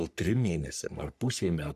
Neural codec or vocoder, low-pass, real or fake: codec, 44.1 kHz, 3.4 kbps, Pupu-Codec; 14.4 kHz; fake